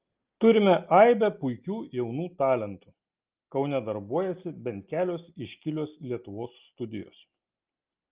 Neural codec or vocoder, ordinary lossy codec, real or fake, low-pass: none; Opus, 32 kbps; real; 3.6 kHz